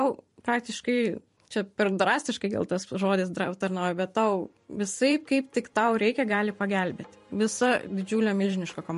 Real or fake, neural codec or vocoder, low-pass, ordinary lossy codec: fake; vocoder, 44.1 kHz, 128 mel bands every 512 samples, BigVGAN v2; 14.4 kHz; MP3, 48 kbps